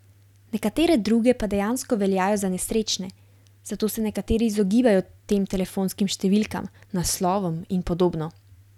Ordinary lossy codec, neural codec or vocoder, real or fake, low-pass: none; none; real; 19.8 kHz